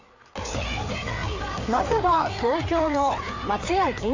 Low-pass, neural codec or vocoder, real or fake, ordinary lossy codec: 7.2 kHz; codec, 16 kHz, 4 kbps, FreqCodec, larger model; fake; AAC, 48 kbps